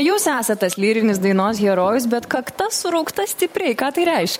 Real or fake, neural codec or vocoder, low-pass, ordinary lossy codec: fake; vocoder, 44.1 kHz, 128 mel bands every 512 samples, BigVGAN v2; 19.8 kHz; MP3, 64 kbps